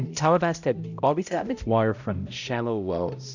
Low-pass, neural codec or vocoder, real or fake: 7.2 kHz; codec, 16 kHz, 0.5 kbps, X-Codec, HuBERT features, trained on balanced general audio; fake